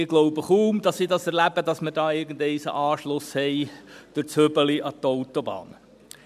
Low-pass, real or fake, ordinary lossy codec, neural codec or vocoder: 14.4 kHz; real; none; none